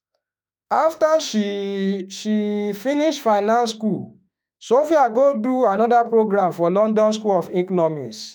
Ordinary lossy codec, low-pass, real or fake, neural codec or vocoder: none; none; fake; autoencoder, 48 kHz, 32 numbers a frame, DAC-VAE, trained on Japanese speech